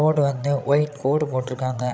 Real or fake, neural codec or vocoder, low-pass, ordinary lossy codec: fake; codec, 16 kHz, 16 kbps, FreqCodec, larger model; none; none